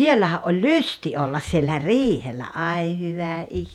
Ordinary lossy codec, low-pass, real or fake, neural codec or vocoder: none; 19.8 kHz; fake; vocoder, 48 kHz, 128 mel bands, Vocos